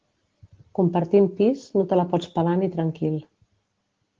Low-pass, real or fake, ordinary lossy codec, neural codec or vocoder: 7.2 kHz; real; Opus, 16 kbps; none